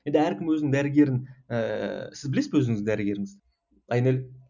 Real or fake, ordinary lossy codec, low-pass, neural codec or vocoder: real; none; 7.2 kHz; none